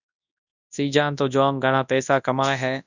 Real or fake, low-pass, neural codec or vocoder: fake; 7.2 kHz; codec, 24 kHz, 0.9 kbps, WavTokenizer, large speech release